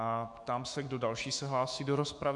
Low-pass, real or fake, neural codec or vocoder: 10.8 kHz; fake; autoencoder, 48 kHz, 128 numbers a frame, DAC-VAE, trained on Japanese speech